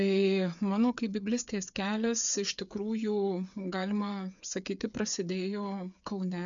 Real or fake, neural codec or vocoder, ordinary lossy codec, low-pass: fake; codec, 16 kHz, 8 kbps, FreqCodec, smaller model; MP3, 96 kbps; 7.2 kHz